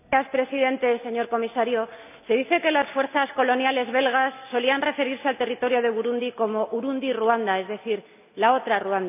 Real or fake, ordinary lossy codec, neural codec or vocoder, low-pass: real; none; none; 3.6 kHz